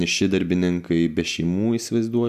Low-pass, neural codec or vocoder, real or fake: 14.4 kHz; none; real